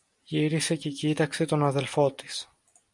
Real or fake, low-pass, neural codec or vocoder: real; 10.8 kHz; none